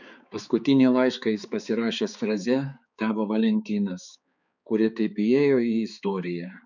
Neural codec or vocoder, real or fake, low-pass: codec, 16 kHz, 4 kbps, X-Codec, HuBERT features, trained on balanced general audio; fake; 7.2 kHz